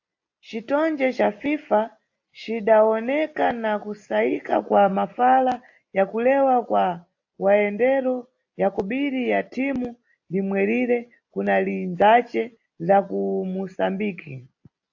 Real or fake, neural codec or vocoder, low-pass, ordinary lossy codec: real; none; 7.2 kHz; AAC, 48 kbps